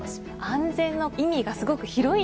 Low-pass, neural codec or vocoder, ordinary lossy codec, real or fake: none; none; none; real